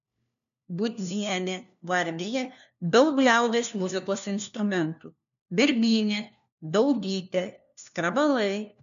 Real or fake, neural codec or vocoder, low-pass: fake; codec, 16 kHz, 1 kbps, FunCodec, trained on LibriTTS, 50 frames a second; 7.2 kHz